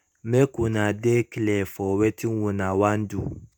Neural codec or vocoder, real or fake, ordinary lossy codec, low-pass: vocoder, 48 kHz, 128 mel bands, Vocos; fake; none; none